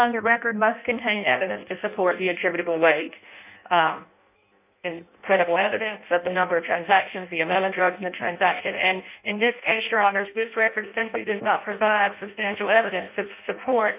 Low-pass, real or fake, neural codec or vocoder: 3.6 kHz; fake; codec, 16 kHz in and 24 kHz out, 0.6 kbps, FireRedTTS-2 codec